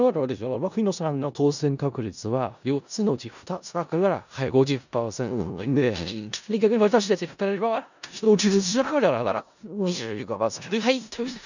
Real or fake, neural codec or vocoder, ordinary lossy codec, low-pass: fake; codec, 16 kHz in and 24 kHz out, 0.4 kbps, LongCat-Audio-Codec, four codebook decoder; none; 7.2 kHz